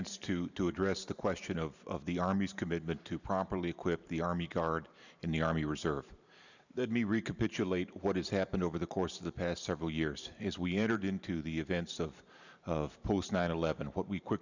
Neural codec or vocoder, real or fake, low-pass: vocoder, 44.1 kHz, 128 mel bands every 512 samples, BigVGAN v2; fake; 7.2 kHz